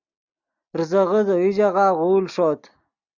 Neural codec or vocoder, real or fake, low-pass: none; real; 7.2 kHz